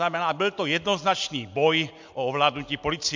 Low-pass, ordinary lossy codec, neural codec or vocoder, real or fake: 7.2 kHz; MP3, 64 kbps; none; real